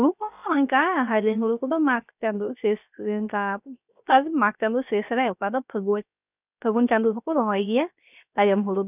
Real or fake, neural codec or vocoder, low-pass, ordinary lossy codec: fake; codec, 16 kHz, 0.7 kbps, FocalCodec; 3.6 kHz; none